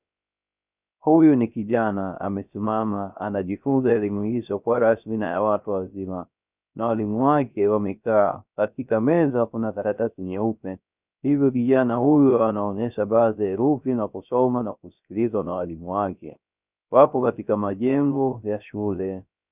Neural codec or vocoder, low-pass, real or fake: codec, 16 kHz, 0.3 kbps, FocalCodec; 3.6 kHz; fake